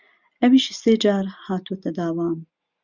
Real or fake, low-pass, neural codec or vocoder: real; 7.2 kHz; none